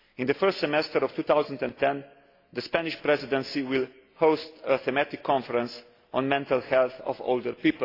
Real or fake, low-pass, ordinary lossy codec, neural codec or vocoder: real; 5.4 kHz; AAC, 32 kbps; none